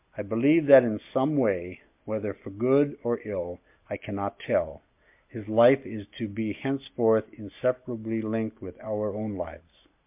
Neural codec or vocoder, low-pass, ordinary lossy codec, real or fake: none; 3.6 kHz; MP3, 32 kbps; real